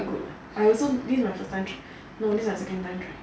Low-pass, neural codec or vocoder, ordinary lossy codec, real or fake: none; none; none; real